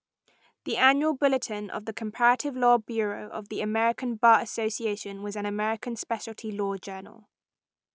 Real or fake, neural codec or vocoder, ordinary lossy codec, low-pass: real; none; none; none